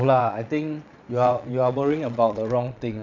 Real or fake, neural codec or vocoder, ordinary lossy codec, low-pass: fake; vocoder, 22.05 kHz, 80 mel bands, Vocos; none; 7.2 kHz